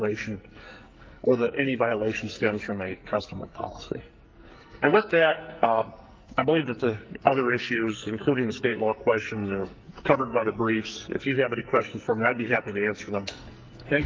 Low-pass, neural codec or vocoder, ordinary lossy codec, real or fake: 7.2 kHz; codec, 44.1 kHz, 2.6 kbps, SNAC; Opus, 32 kbps; fake